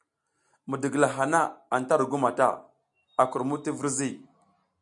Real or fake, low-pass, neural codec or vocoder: real; 10.8 kHz; none